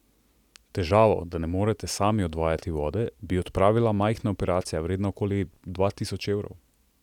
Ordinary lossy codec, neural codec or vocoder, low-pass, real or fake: none; none; 19.8 kHz; real